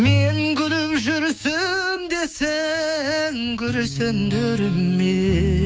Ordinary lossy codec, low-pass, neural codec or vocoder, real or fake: none; none; codec, 16 kHz, 6 kbps, DAC; fake